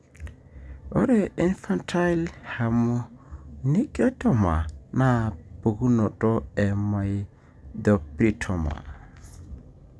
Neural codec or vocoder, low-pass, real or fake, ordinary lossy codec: none; none; real; none